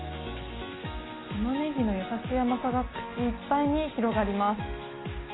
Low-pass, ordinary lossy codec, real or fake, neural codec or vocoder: 7.2 kHz; AAC, 16 kbps; real; none